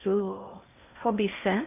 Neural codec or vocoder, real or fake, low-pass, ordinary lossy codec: codec, 16 kHz in and 24 kHz out, 0.6 kbps, FocalCodec, streaming, 2048 codes; fake; 3.6 kHz; none